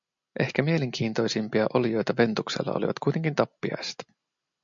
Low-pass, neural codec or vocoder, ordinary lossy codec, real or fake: 7.2 kHz; none; MP3, 64 kbps; real